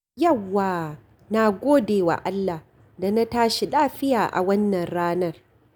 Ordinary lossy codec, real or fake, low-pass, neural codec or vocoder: none; real; none; none